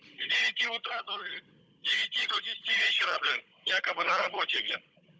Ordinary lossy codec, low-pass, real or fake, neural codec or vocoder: none; none; fake; codec, 16 kHz, 16 kbps, FunCodec, trained on Chinese and English, 50 frames a second